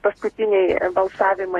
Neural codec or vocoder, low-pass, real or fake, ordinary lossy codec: vocoder, 48 kHz, 128 mel bands, Vocos; 19.8 kHz; fake; AAC, 32 kbps